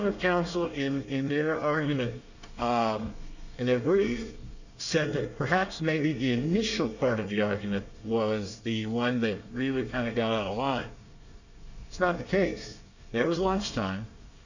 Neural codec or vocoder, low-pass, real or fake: codec, 24 kHz, 1 kbps, SNAC; 7.2 kHz; fake